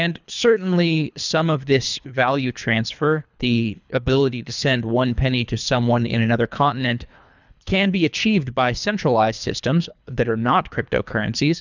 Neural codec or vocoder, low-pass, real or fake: codec, 24 kHz, 3 kbps, HILCodec; 7.2 kHz; fake